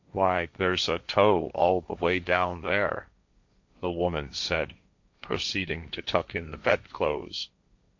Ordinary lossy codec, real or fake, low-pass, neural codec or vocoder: MP3, 64 kbps; fake; 7.2 kHz; codec, 16 kHz, 1.1 kbps, Voila-Tokenizer